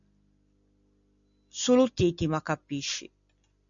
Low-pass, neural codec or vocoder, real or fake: 7.2 kHz; none; real